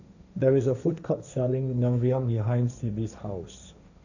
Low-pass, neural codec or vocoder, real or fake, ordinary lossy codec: 7.2 kHz; codec, 16 kHz, 1.1 kbps, Voila-Tokenizer; fake; none